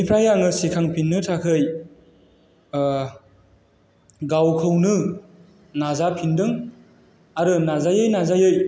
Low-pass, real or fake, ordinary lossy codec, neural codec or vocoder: none; real; none; none